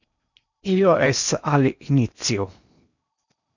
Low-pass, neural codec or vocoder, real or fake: 7.2 kHz; codec, 16 kHz in and 24 kHz out, 0.8 kbps, FocalCodec, streaming, 65536 codes; fake